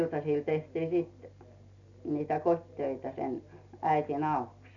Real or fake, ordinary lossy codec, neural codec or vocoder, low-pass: real; none; none; 7.2 kHz